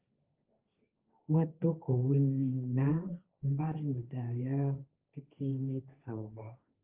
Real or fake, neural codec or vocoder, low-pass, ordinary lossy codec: fake; codec, 16 kHz, 1.1 kbps, Voila-Tokenizer; 3.6 kHz; Opus, 24 kbps